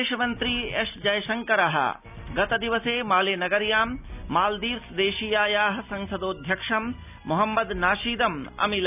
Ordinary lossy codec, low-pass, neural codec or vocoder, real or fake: none; 3.6 kHz; none; real